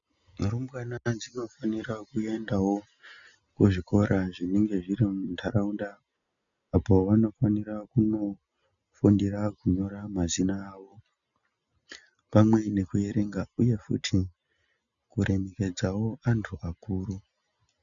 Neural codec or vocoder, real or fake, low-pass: none; real; 7.2 kHz